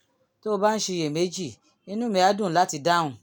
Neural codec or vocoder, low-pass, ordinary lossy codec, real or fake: none; none; none; real